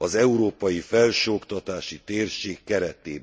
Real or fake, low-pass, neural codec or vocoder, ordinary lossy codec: real; none; none; none